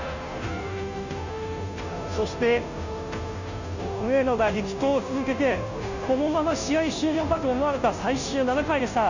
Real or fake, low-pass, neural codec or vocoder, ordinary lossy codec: fake; 7.2 kHz; codec, 16 kHz, 0.5 kbps, FunCodec, trained on Chinese and English, 25 frames a second; none